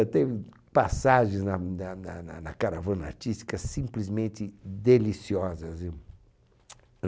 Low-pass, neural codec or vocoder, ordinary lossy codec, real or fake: none; none; none; real